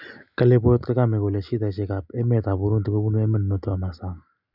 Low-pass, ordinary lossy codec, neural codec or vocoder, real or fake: 5.4 kHz; none; none; real